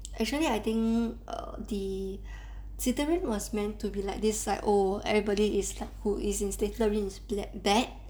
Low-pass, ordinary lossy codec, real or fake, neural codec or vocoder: none; none; real; none